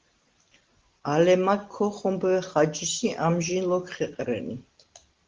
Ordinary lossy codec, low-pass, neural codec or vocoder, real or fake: Opus, 16 kbps; 7.2 kHz; none; real